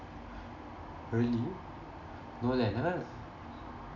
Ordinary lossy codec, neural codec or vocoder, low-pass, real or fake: none; none; 7.2 kHz; real